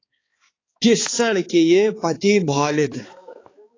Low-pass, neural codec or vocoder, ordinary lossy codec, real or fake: 7.2 kHz; codec, 16 kHz, 4 kbps, X-Codec, HuBERT features, trained on balanced general audio; AAC, 32 kbps; fake